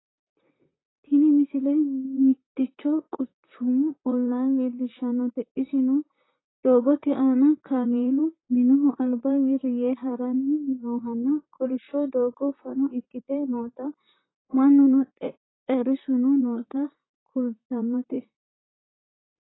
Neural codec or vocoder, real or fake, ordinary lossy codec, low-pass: vocoder, 44.1 kHz, 128 mel bands, Pupu-Vocoder; fake; AAC, 16 kbps; 7.2 kHz